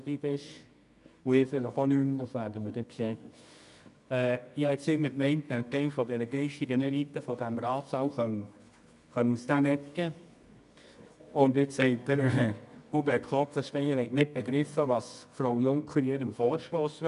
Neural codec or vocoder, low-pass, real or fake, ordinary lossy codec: codec, 24 kHz, 0.9 kbps, WavTokenizer, medium music audio release; 10.8 kHz; fake; none